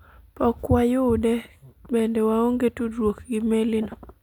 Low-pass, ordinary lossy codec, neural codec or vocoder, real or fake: 19.8 kHz; none; none; real